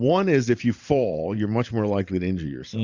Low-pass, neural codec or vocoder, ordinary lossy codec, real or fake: 7.2 kHz; vocoder, 44.1 kHz, 128 mel bands every 512 samples, BigVGAN v2; Opus, 64 kbps; fake